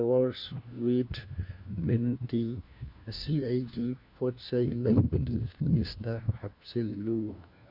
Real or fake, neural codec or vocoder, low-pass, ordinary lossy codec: fake; codec, 16 kHz, 1 kbps, FunCodec, trained on LibriTTS, 50 frames a second; 5.4 kHz; none